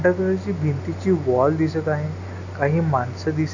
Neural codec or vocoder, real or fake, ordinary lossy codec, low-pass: none; real; none; 7.2 kHz